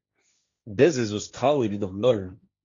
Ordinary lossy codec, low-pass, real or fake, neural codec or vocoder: AAC, 48 kbps; 7.2 kHz; fake; codec, 16 kHz, 1.1 kbps, Voila-Tokenizer